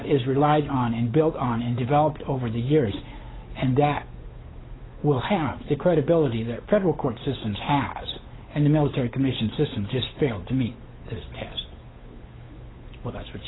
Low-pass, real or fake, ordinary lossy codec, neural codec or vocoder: 7.2 kHz; fake; AAC, 16 kbps; codec, 16 kHz, 8 kbps, FunCodec, trained on Chinese and English, 25 frames a second